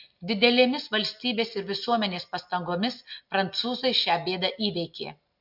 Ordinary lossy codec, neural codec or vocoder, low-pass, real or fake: MP3, 48 kbps; none; 5.4 kHz; real